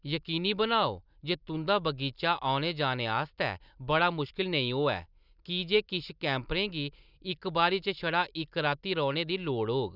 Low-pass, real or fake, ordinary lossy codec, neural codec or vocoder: 5.4 kHz; real; none; none